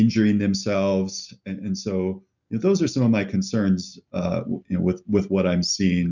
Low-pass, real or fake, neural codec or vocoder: 7.2 kHz; real; none